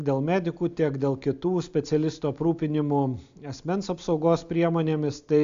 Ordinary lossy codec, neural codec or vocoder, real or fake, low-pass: AAC, 64 kbps; none; real; 7.2 kHz